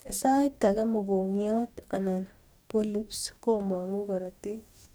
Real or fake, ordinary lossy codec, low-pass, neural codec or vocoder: fake; none; none; codec, 44.1 kHz, 2.6 kbps, DAC